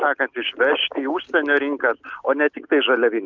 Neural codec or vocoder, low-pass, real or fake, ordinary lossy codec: none; 7.2 kHz; real; Opus, 24 kbps